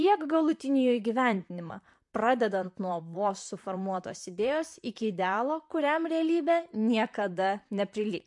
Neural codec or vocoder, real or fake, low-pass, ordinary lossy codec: vocoder, 44.1 kHz, 128 mel bands, Pupu-Vocoder; fake; 10.8 kHz; MP3, 64 kbps